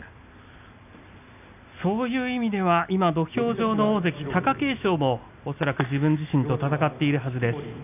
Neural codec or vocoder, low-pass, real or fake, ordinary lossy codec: none; 3.6 kHz; real; none